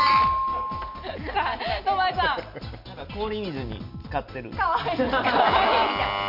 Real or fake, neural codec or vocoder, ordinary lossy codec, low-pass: real; none; none; 5.4 kHz